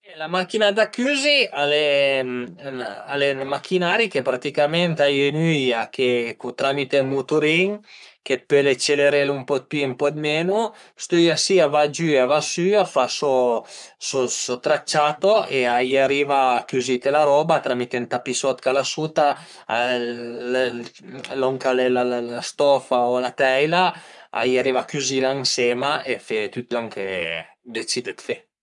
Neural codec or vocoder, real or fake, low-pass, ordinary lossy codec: codec, 44.1 kHz, 3.4 kbps, Pupu-Codec; fake; 10.8 kHz; none